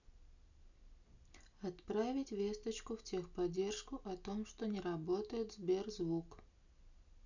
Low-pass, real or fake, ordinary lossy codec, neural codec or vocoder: 7.2 kHz; real; none; none